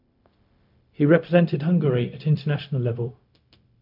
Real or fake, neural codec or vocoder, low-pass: fake; codec, 16 kHz, 0.4 kbps, LongCat-Audio-Codec; 5.4 kHz